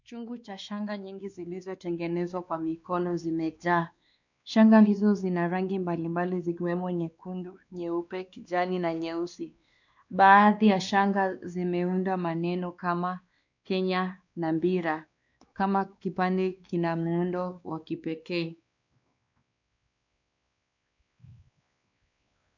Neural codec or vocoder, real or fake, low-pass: codec, 16 kHz, 2 kbps, X-Codec, WavLM features, trained on Multilingual LibriSpeech; fake; 7.2 kHz